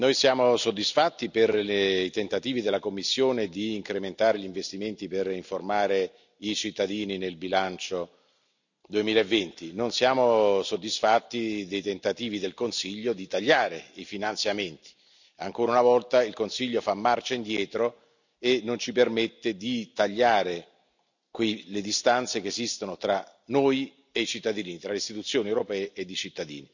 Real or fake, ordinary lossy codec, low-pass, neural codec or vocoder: real; none; 7.2 kHz; none